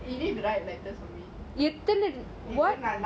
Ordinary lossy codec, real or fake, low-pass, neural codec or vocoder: none; real; none; none